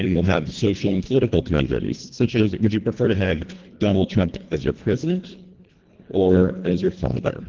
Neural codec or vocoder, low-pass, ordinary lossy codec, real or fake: codec, 24 kHz, 1.5 kbps, HILCodec; 7.2 kHz; Opus, 16 kbps; fake